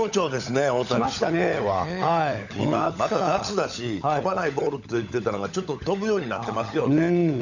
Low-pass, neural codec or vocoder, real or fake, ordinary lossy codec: 7.2 kHz; codec, 16 kHz, 16 kbps, FunCodec, trained on LibriTTS, 50 frames a second; fake; none